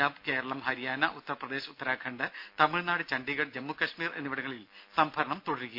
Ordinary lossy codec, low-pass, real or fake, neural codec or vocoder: MP3, 48 kbps; 5.4 kHz; real; none